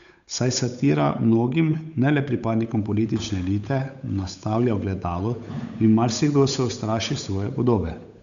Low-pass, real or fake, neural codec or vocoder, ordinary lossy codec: 7.2 kHz; fake; codec, 16 kHz, 8 kbps, FunCodec, trained on Chinese and English, 25 frames a second; none